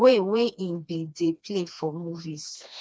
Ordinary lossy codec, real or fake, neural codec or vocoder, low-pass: none; fake; codec, 16 kHz, 2 kbps, FreqCodec, smaller model; none